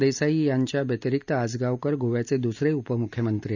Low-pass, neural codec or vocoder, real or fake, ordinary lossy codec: 7.2 kHz; none; real; none